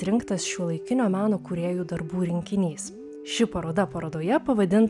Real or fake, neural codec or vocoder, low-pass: real; none; 10.8 kHz